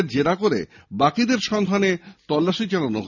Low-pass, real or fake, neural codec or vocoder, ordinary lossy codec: 7.2 kHz; real; none; none